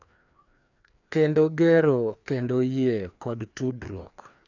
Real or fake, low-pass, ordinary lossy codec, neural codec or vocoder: fake; 7.2 kHz; none; codec, 16 kHz, 2 kbps, FreqCodec, larger model